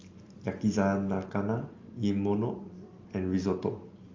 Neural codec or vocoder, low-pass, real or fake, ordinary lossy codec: none; 7.2 kHz; real; Opus, 32 kbps